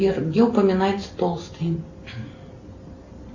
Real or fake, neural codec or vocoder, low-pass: real; none; 7.2 kHz